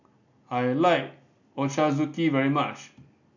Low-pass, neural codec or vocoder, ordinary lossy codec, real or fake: 7.2 kHz; none; none; real